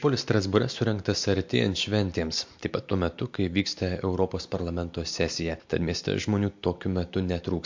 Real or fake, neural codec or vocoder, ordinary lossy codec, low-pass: real; none; MP3, 48 kbps; 7.2 kHz